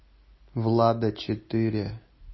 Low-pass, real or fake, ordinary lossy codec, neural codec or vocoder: 7.2 kHz; real; MP3, 24 kbps; none